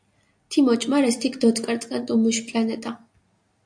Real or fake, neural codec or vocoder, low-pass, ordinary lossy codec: real; none; 9.9 kHz; AAC, 64 kbps